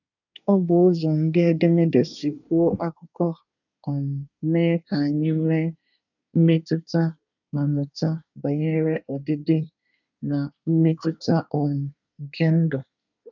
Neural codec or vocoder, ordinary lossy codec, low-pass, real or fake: codec, 24 kHz, 1 kbps, SNAC; none; 7.2 kHz; fake